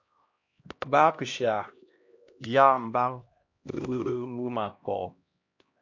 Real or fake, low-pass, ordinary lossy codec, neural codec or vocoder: fake; 7.2 kHz; MP3, 48 kbps; codec, 16 kHz, 1 kbps, X-Codec, HuBERT features, trained on LibriSpeech